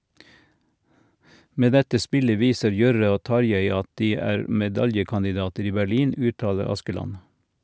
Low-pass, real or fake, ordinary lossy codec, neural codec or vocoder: none; real; none; none